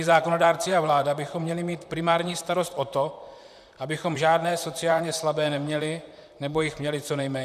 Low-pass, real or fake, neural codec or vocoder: 14.4 kHz; fake; vocoder, 44.1 kHz, 128 mel bands, Pupu-Vocoder